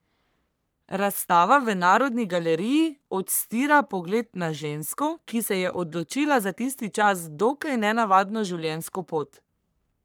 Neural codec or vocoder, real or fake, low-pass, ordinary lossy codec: codec, 44.1 kHz, 3.4 kbps, Pupu-Codec; fake; none; none